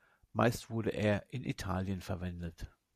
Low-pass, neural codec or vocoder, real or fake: 14.4 kHz; none; real